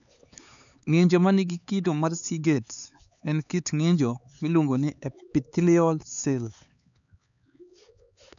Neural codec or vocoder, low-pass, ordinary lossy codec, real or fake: codec, 16 kHz, 4 kbps, X-Codec, HuBERT features, trained on LibriSpeech; 7.2 kHz; none; fake